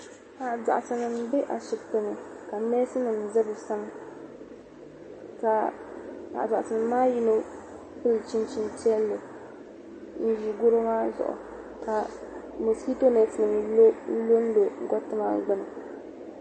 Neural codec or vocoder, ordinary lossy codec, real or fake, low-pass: none; MP3, 32 kbps; real; 9.9 kHz